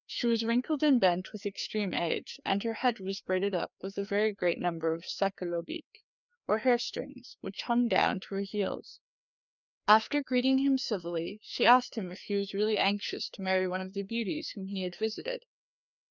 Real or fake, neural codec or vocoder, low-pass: fake; codec, 16 kHz, 2 kbps, FreqCodec, larger model; 7.2 kHz